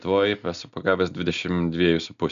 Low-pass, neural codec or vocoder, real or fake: 7.2 kHz; none; real